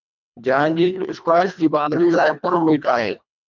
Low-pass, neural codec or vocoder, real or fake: 7.2 kHz; codec, 24 kHz, 1.5 kbps, HILCodec; fake